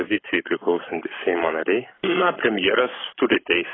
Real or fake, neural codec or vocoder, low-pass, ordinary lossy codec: fake; codec, 44.1 kHz, 7.8 kbps, Pupu-Codec; 7.2 kHz; AAC, 16 kbps